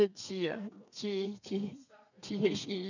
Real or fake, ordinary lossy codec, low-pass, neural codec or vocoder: fake; none; 7.2 kHz; codec, 32 kHz, 1.9 kbps, SNAC